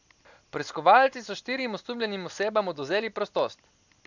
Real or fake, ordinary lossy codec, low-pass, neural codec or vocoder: real; none; 7.2 kHz; none